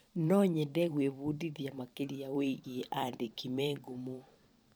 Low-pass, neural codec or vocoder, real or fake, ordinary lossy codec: none; vocoder, 44.1 kHz, 128 mel bands every 512 samples, BigVGAN v2; fake; none